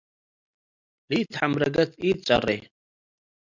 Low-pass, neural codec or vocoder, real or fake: 7.2 kHz; none; real